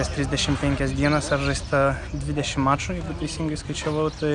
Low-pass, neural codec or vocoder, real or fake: 9.9 kHz; none; real